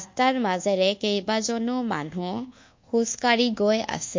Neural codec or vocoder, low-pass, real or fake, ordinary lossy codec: codec, 24 kHz, 1.2 kbps, DualCodec; 7.2 kHz; fake; MP3, 48 kbps